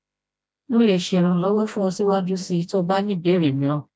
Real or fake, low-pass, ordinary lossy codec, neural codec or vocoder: fake; none; none; codec, 16 kHz, 1 kbps, FreqCodec, smaller model